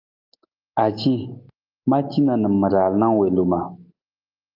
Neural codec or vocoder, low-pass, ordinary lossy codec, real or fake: none; 5.4 kHz; Opus, 24 kbps; real